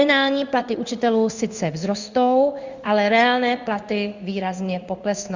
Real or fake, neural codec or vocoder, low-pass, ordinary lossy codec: fake; codec, 16 kHz in and 24 kHz out, 1 kbps, XY-Tokenizer; 7.2 kHz; Opus, 64 kbps